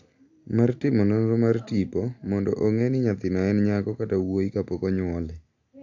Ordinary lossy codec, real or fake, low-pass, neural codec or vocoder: AAC, 48 kbps; real; 7.2 kHz; none